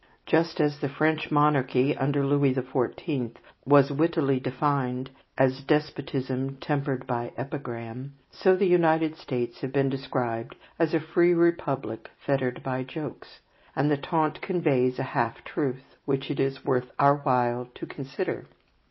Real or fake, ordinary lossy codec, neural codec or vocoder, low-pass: real; MP3, 24 kbps; none; 7.2 kHz